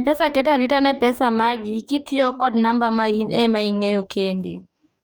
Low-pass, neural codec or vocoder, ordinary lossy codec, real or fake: none; codec, 44.1 kHz, 2.6 kbps, DAC; none; fake